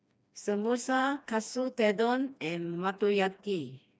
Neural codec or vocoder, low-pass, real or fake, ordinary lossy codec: codec, 16 kHz, 2 kbps, FreqCodec, smaller model; none; fake; none